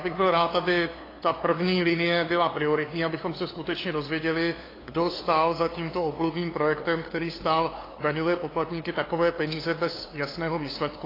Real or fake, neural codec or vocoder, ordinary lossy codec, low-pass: fake; codec, 16 kHz, 2 kbps, FunCodec, trained on LibriTTS, 25 frames a second; AAC, 24 kbps; 5.4 kHz